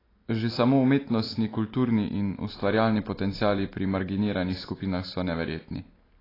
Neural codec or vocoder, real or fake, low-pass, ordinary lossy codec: none; real; 5.4 kHz; AAC, 24 kbps